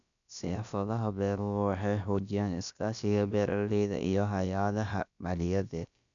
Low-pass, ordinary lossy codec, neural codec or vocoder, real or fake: 7.2 kHz; none; codec, 16 kHz, about 1 kbps, DyCAST, with the encoder's durations; fake